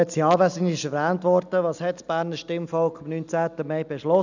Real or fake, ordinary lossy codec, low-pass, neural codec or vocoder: real; none; 7.2 kHz; none